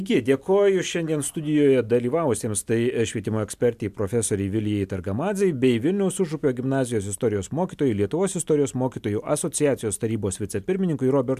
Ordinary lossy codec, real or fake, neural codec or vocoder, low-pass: MP3, 96 kbps; real; none; 14.4 kHz